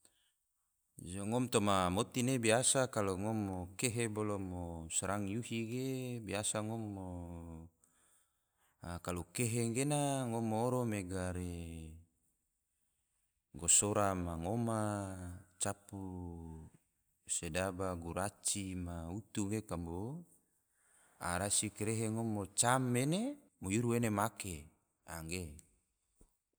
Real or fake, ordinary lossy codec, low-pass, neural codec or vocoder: real; none; none; none